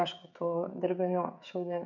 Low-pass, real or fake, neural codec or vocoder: 7.2 kHz; fake; codec, 16 kHz, 16 kbps, FreqCodec, smaller model